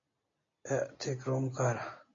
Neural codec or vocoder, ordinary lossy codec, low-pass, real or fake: none; AAC, 32 kbps; 7.2 kHz; real